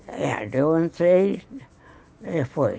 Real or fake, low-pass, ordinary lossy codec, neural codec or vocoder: real; none; none; none